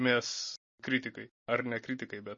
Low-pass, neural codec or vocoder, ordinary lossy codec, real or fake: 7.2 kHz; none; MP3, 32 kbps; real